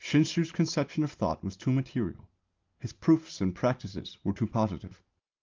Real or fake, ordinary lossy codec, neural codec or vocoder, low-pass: real; Opus, 24 kbps; none; 7.2 kHz